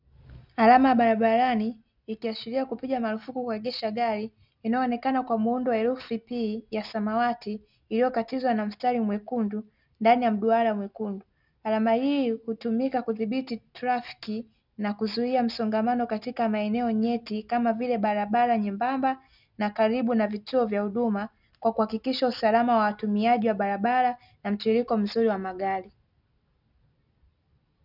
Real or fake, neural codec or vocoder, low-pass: real; none; 5.4 kHz